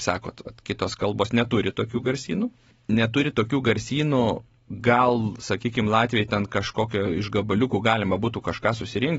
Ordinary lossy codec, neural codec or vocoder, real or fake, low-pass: AAC, 24 kbps; none; real; 19.8 kHz